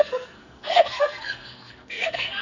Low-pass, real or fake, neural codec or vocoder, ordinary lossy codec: 7.2 kHz; fake; codec, 44.1 kHz, 2.6 kbps, SNAC; none